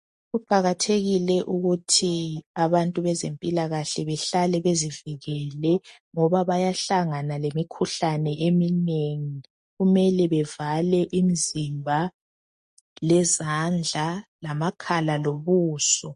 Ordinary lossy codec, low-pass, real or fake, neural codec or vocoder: MP3, 48 kbps; 10.8 kHz; real; none